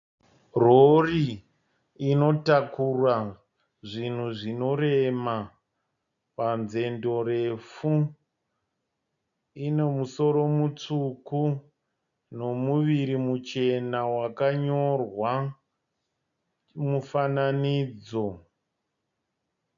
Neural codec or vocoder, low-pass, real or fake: none; 7.2 kHz; real